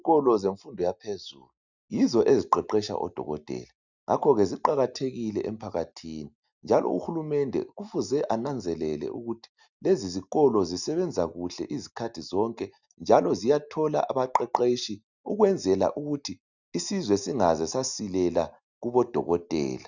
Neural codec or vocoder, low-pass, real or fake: none; 7.2 kHz; real